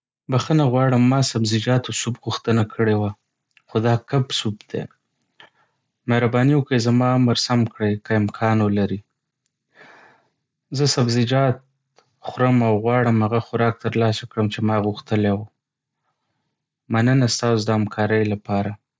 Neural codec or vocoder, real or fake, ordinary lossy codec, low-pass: none; real; none; none